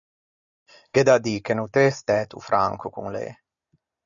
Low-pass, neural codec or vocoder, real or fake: 7.2 kHz; none; real